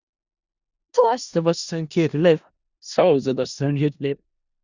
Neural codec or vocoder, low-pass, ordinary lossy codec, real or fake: codec, 16 kHz in and 24 kHz out, 0.4 kbps, LongCat-Audio-Codec, four codebook decoder; 7.2 kHz; Opus, 64 kbps; fake